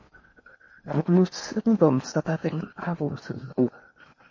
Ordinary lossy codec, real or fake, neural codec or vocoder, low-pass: MP3, 32 kbps; fake; codec, 16 kHz in and 24 kHz out, 0.8 kbps, FocalCodec, streaming, 65536 codes; 7.2 kHz